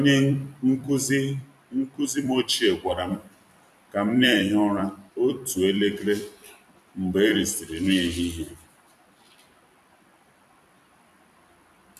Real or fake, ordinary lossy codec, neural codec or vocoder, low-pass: fake; none; vocoder, 44.1 kHz, 128 mel bands every 256 samples, BigVGAN v2; 14.4 kHz